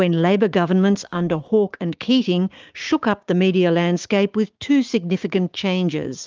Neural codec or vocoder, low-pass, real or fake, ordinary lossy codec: codec, 16 kHz, 6 kbps, DAC; 7.2 kHz; fake; Opus, 24 kbps